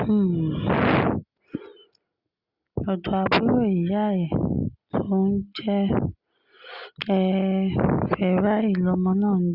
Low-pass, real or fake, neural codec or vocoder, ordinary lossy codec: 5.4 kHz; real; none; Opus, 64 kbps